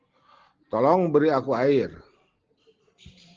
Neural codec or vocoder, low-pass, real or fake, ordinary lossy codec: none; 7.2 kHz; real; Opus, 16 kbps